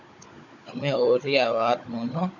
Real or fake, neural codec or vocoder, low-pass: fake; codec, 16 kHz, 16 kbps, FunCodec, trained on Chinese and English, 50 frames a second; 7.2 kHz